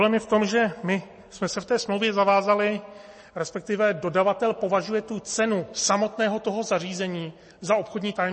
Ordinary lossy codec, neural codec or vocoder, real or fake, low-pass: MP3, 32 kbps; none; real; 10.8 kHz